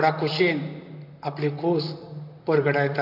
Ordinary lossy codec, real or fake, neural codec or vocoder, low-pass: AAC, 48 kbps; fake; vocoder, 44.1 kHz, 128 mel bands, Pupu-Vocoder; 5.4 kHz